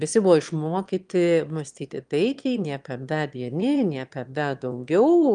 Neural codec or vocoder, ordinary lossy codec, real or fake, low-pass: autoencoder, 22.05 kHz, a latent of 192 numbers a frame, VITS, trained on one speaker; Opus, 32 kbps; fake; 9.9 kHz